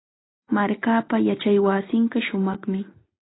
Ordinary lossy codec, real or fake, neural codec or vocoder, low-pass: AAC, 16 kbps; real; none; 7.2 kHz